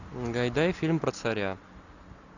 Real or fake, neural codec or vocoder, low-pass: real; none; 7.2 kHz